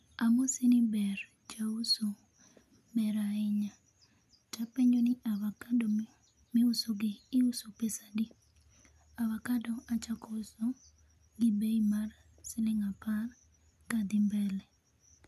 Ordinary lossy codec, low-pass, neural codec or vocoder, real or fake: none; 14.4 kHz; none; real